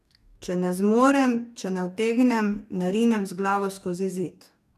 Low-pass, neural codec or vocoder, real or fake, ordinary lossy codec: 14.4 kHz; codec, 44.1 kHz, 2.6 kbps, DAC; fake; none